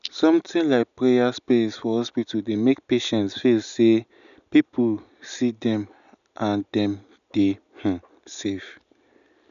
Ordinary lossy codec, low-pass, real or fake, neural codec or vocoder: none; 7.2 kHz; real; none